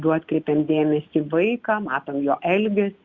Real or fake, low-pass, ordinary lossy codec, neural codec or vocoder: real; 7.2 kHz; Opus, 64 kbps; none